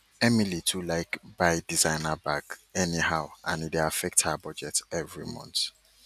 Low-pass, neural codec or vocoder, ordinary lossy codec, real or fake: 14.4 kHz; none; none; real